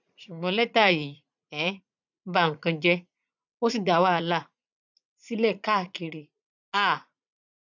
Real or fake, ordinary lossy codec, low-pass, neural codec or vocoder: fake; none; 7.2 kHz; vocoder, 44.1 kHz, 80 mel bands, Vocos